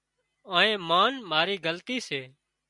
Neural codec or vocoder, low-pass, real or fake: none; 10.8 kHz; real